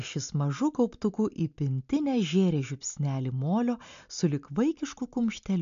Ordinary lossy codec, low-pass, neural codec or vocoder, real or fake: MP3, 64 kbps; 7.2 kHz; none; real